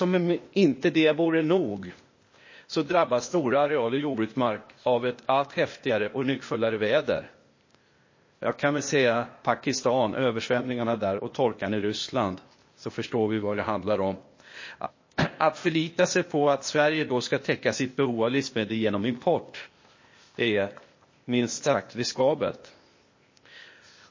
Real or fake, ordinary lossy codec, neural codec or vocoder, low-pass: fake; MP3, 32 kbps; codec, 16 kHz, 0.8 kbps, ZipCodec; 7.2 kHz